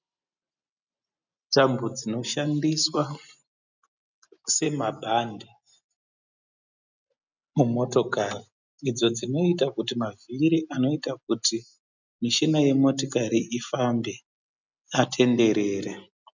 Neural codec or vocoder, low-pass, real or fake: none; 7.2 kHz; real